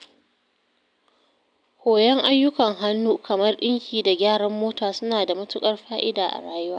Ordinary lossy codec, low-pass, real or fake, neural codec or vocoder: none; 9.9 kHz; real; none